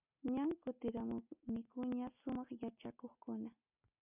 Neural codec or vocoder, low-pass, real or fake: none; 3.6 kHz; real